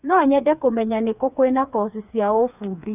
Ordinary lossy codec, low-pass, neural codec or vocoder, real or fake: none; 3.6 kHz; codec, 16 kHz, 4 kbps, FreqCodec, smaller model; fake